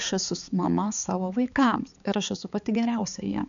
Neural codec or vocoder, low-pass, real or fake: codec, 16 kHz, 4 kbps, X-Codec, HuBERT features, trained on balanced general audio; 7.2 kHz; fake